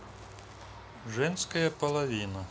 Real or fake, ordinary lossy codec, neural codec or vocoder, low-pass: real; none; none; none